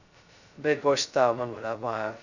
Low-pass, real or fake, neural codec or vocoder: 7.2 kHz; fake; codec, 16 kHz, 0.2 kbps, FocalCodec